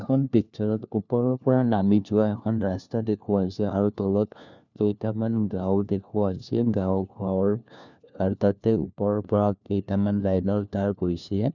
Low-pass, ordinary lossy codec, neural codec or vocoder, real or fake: 7.2 kHz; none; codec, 16 kHz, 1 kbps, FunCodec, trained on LibriTTS, 50 frames a second; fake